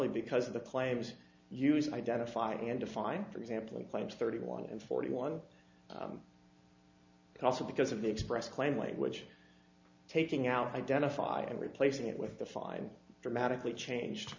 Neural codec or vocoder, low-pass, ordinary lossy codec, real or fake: none; 7.2 kHz; MP3, 64 kbps; real